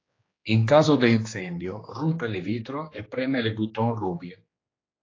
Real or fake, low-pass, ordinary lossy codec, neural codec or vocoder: fake; 7.2 kHz; AAC, 32 kbps; codec, 16 kHz, 2 kbps, X-Codec, HuBERT features, trained on general audio